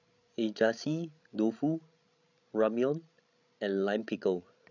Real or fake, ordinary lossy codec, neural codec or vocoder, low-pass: fake; none; codec, 16 kHz, 16 kbps, FreqCodec, larger model; 7.2 kHz